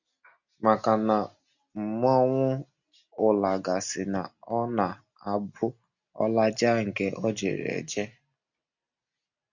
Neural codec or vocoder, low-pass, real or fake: none; 7.2 kHz; real